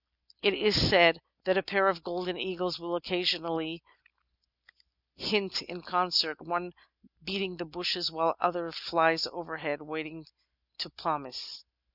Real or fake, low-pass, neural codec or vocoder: real; 5.4 kHz; none